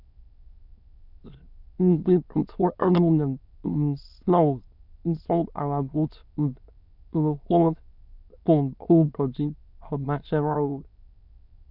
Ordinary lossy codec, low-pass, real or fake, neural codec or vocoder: none; 5.4 kHz; fake; autoencoder, 22.05 kHz, a latent of 192 numbers a frame, VITS, trained on many speakers